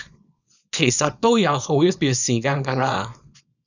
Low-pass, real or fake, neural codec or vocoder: 7.2 kHz; fake; codec, 24 kHz, 0.9 kbps, WavTokenizer, small release